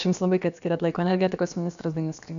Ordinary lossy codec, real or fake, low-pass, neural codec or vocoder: MP3, 96 kbps; fake; 7.2 kHz; codec, 16 kHz, about 1 kbps, DyCAST, with the encoder's durations